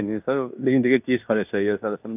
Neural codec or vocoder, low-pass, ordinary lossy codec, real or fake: codec, 16 kHz in and 24 kHz out, 0.9 kbps, LongCat-Audio-Codec, fine tuned four codebook decoder; 3.6 kHz; none; fake